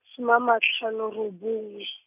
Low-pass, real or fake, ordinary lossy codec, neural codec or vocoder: 3.6 kHz; real; none; none